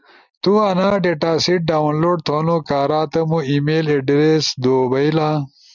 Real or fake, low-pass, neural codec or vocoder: real; 7.2 kHz; none